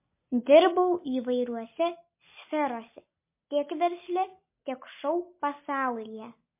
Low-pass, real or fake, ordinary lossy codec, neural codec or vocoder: 3.6 kHz; real; MP3, 24 kbps; none